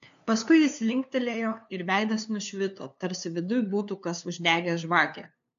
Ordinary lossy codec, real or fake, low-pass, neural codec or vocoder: AAC, 64 kbps; fake; 7.2 kHz; codec, 16 kHz, 2 kbps, FunCodec, trained on LibriTTS, 25 frames a second